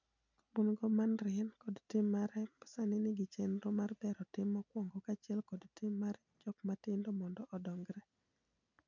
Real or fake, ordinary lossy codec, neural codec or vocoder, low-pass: real; none; none; 7.2 kHz